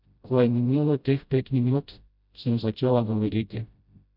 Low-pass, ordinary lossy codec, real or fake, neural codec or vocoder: 5.4 kHz; none; fake; codec, 16 kHz, 0.5 kbps, FreqCodec, smaller model